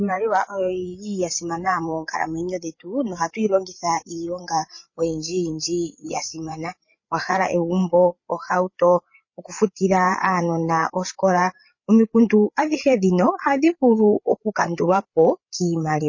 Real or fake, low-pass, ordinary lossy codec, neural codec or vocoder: fake; 7.2 kHz; MP3, 32 kbps; codec, 16 kHz, 8 kbps, FreqCodec, larger model